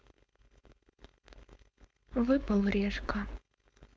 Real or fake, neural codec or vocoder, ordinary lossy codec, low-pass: fake; codec, 16 kHz, 4.8 kbps, FACodec; none; none